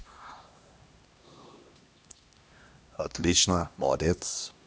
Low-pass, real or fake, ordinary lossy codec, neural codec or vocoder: none; fake; none; codec, 16 kHz, 1 kbps, X-Codec, HuBERT features, trained on LibriSpeech